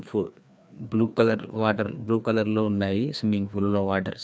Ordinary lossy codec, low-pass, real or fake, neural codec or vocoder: none; none; fake; codec, 16 kHz, 2 kbps, FreqCodec, larger model